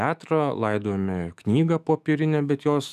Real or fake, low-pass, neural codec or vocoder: fake; 14.4 kHz; autoencoder, 48 kHz, 128 numbers a frame, DAC-VAE, trained on Japanese speech